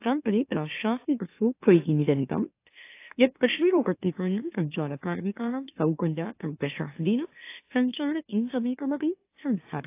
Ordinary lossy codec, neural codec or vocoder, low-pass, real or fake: AAC, 24 kbps; autoencoder, 44.1 kHz, a latent of 192 numbers a frame, MeloTTS; 3.6 kHz; fake